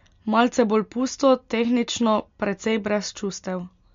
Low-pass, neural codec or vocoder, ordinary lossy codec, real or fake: 7.2 kHz; none; MP3, 48 kbps; real